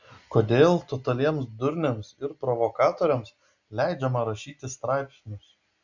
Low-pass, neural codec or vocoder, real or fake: 7.2 kHz; none; real